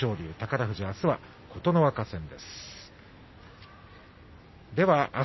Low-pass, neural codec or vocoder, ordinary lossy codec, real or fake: 7.2 kHz; none; MP3, 24 kbps; real